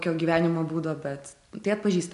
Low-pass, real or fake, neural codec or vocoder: 10.8 kHz; real; none